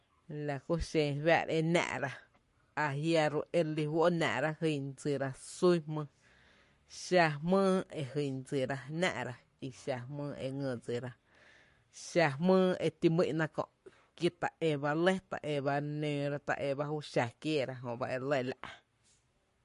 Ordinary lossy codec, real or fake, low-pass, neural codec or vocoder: MP3, 48 kbps; fake; 14.4 kHz; codec, 44.1 kHz, 7.8 kbps, Pupu-Codec